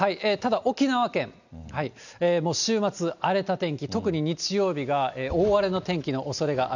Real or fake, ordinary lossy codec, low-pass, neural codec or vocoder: real; none; 7.2 kHz; none